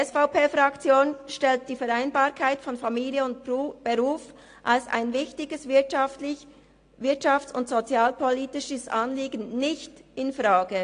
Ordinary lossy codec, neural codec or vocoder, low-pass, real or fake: AAC, 48 kbps; none; 9.9 kHz; real